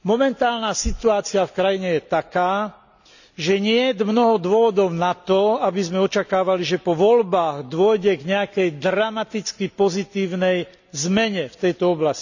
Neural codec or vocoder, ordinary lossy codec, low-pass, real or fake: none; none; 7.2 kHz; real